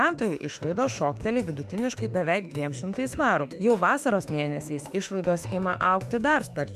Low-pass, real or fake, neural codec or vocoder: 14.4 kHz; fake; autoencoder, 48 kHz, 32 numbers a frame, DAC-VAE, trained on Japanese speech